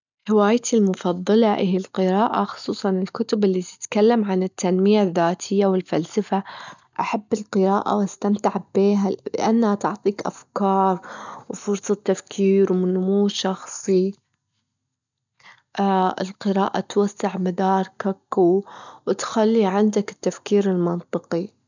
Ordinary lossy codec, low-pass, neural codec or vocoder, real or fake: none; 7.2 kHz; none; real